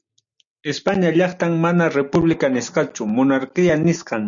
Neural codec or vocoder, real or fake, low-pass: none; real; 7.2 kHz